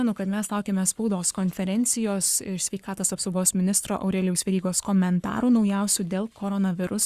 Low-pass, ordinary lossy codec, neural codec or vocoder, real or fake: 14.4 kHz; Opus, 64 kbps; codec, 44.1 kHz, 7.8 kbps, Pupu-Codec; fake